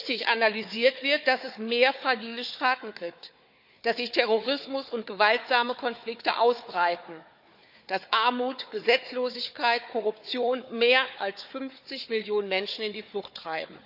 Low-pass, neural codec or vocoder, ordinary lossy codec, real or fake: 5.4 kHz; codec, 16 kHz, 4 kbps, FunCodec, trained on Chinese and English, 50 frames a second; none; fake